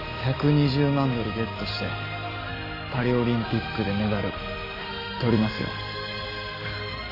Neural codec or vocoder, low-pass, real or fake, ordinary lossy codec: none; 5.4 kHz; real; AAC, 32 kbps